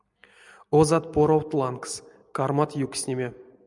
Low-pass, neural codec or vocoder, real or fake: 10.8 kHz; none; real